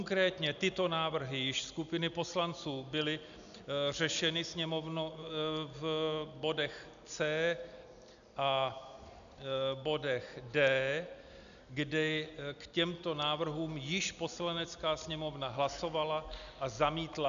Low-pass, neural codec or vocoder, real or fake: 7.2 kHz; none; real